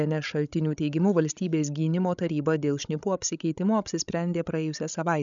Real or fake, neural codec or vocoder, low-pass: fake; codec, 16 kHz, 16 kbps, FreqCodec, larger model; 7.2 kHz